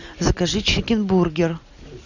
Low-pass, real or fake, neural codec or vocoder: 7.2 kHz; real; none